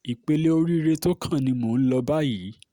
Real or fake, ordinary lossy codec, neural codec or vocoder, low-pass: real; Opus, 64 kbps; none; 19.8 kHz